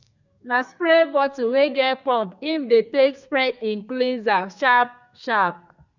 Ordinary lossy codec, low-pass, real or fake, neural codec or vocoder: none; 7.2 kHz; fake; codec, 32 kHz, 1.9 kbps, SNAC